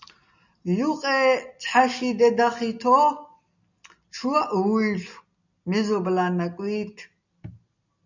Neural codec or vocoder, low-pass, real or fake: none; 7.2 kHz; real